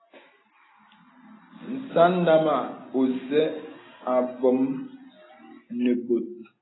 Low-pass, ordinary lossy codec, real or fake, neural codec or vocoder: 7.2 kHz; AAC, 16 kbps; real; none